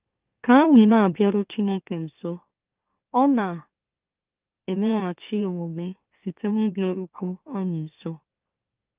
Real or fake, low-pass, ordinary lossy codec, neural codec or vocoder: fake; 3.6 kHz; Opus, 32 kbps; autoencoder, 44.1 kHz, a latent of 192 numbers a frame, MeloTTS